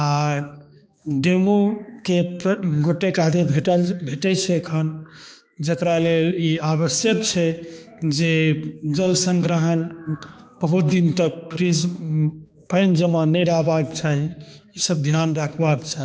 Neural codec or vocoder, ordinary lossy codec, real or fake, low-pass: codec, 16 kHz, 2 kbps, X-Codec, HuBERT features, trained on balanced general audio; none; fake; none